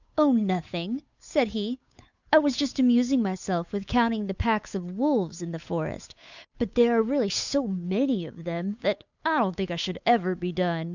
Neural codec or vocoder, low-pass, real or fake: codec, 16 kHz, 8 kbps, FunCodec, trained on Chinese and English, 25 frames a second; 7.2 kHz; fake